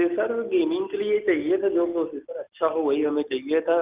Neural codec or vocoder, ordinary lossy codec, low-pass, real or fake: none; Opus, 24 kbps; 3.6 kHz; real